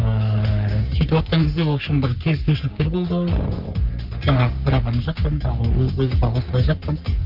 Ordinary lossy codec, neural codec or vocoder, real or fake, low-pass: Opus, 24 kbps; codec, 44.1 kHz, 3.4 kbps, Pupu-Codec; fake; 5.4 kHz